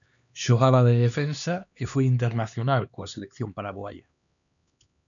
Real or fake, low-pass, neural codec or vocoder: fake; 7.2 kHz; codec, 16 kHz, 2 kbps, X-Codec, HuBERT features, trained on LibriSpeech